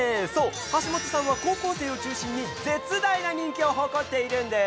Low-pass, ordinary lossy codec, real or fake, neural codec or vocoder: none; none; real; none